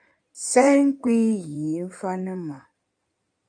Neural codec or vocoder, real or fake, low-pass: codec, 16 kHz in and 24 kHz out, 2.2 kbps, FireRedTTS-2 codec; fake; 9.9 kHz